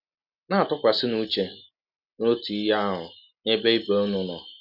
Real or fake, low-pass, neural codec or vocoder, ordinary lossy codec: real; 5.4 kHz; none; none